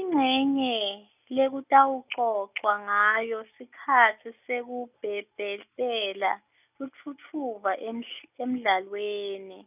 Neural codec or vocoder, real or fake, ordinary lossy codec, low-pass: none; real; none; 3.6 kHz